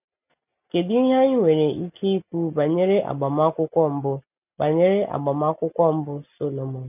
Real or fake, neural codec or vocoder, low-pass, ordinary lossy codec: real; none; 3.6 kHz; none